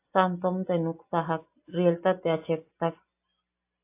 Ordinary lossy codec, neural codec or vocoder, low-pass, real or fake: AAC, 24 kbps; none; 3.6 kHz; real